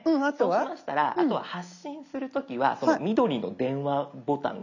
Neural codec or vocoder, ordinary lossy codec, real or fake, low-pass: none; none; real; 7.2 kHz